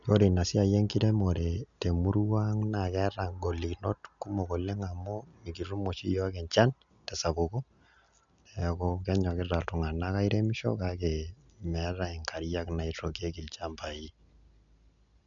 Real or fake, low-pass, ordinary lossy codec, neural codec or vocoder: real; 7.2 kHz; none; none